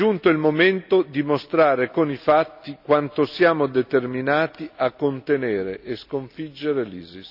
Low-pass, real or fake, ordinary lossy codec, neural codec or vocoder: 5.4 kHz; real; none; none